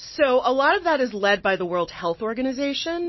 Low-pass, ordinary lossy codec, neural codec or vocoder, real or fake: 7.2 kHz; MP3, 24 kbps; none; real